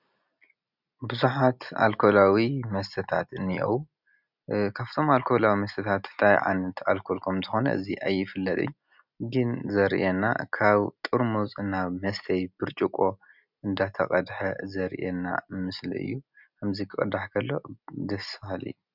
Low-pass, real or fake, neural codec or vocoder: 5.4 kHz; real; none